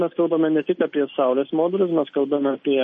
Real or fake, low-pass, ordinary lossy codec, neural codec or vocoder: real; 10.8 kHz; MP3, 32 kbps; none